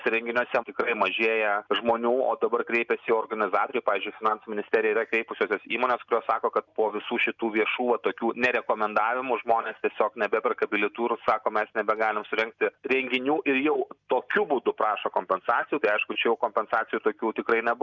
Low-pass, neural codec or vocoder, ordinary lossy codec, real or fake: 7.2 kHz; none; Opus, 64 kbps; real